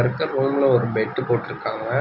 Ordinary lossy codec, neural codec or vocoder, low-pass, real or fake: none; none; 5.4 kHz; real